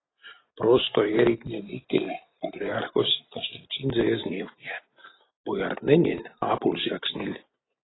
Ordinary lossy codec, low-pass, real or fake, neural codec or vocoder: AAC, 16 kbps; 7.2 kHz; real; none